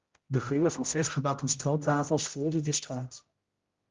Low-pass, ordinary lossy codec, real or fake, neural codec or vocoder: 7.2 kHz; Opus, 32 kbps; fake; codec, 16 kHz, 0.5 kbps, X-Codec, HuBERT features, trained on general audio